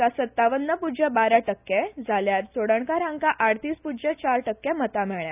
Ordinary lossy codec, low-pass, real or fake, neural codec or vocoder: none; 3.6 kHz; real; none